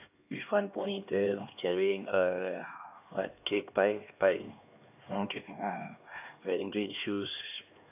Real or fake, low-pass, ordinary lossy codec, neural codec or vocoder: fake; 3.6 kHz; AAC, 32 kbps; codec, 16 kHz, 2 kbps, X-Codec, HuBERT features, trained on LibriSpeech